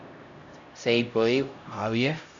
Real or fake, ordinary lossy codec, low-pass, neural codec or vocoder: fake; AAC, 64 kbps; 7.2 kHz; codec, 16 kHz, 0.5 kbps, X-Codec, HuBERT features, trained on LibriSpeech